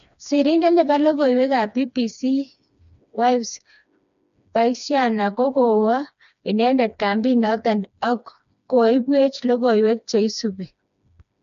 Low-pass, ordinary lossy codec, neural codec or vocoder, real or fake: 7.2 kHz; none; codec, 16 kHz, 2 kbps, FreqCodec, smaller model; fake